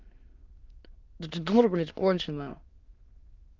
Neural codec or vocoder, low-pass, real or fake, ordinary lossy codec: autoencoder, 22.05 kHz, a latent of 192 numbers a frame, VITS, trained on many speakers; 7.2 kHz; fake; Opus, 32 kbps